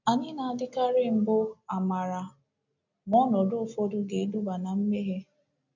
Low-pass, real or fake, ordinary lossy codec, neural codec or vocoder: 7.2 kHz; real; none; none